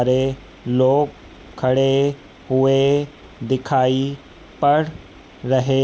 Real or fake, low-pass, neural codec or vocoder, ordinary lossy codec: real; none; none; none